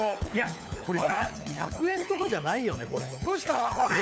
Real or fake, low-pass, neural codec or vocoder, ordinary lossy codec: fake; none; codec, 16 kHz, 4 kbps, FunCodec, trained on LibriTTS, 50 frames a second; none